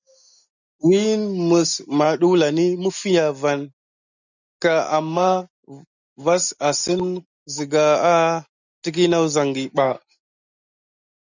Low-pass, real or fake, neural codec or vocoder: 7.2 kHz; real; none